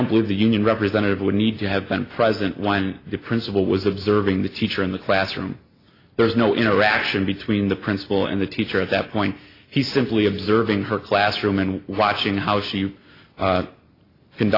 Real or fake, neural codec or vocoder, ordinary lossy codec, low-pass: real; none; AAC, 32 kbps; 5.4 kHz